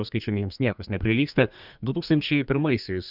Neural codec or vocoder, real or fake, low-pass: codec, 32 kHz, 1.9 kbps, SNAC; fake; 5.4 kHz